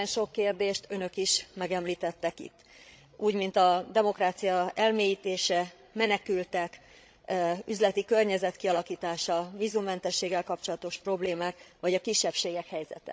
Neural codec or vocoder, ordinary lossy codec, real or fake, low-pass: codec, 16 kHz, 16 kbps, FreqCodec, larger model; none; fake; none